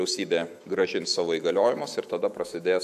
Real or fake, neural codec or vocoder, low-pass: fake; autoencoder, 48 kHz, 128 numbers a frame, DAC-VAE, trained on Japanese speech; 14.4 kHz